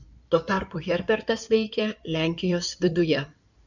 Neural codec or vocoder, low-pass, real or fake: codec, 16 kHz in and 24 kHz out, 2.2 kbps, FireRedTTS-2 codec; 7.2 kHz; fake